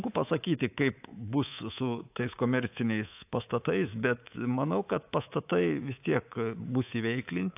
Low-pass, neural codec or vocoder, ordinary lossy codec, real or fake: 3.6 kHz; codec, 24 kHz, 3.1 kbps, DualCodec; AAC, 32 kbps; fake